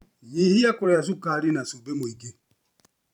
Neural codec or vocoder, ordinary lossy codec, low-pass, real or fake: vocoder, 44.1 kHz, 128 mel bands every 512 samples, BigVGAN v2; none; 19.8 kHz; fake